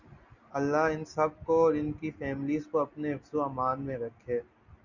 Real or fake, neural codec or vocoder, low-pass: real; none; 7.2 kHz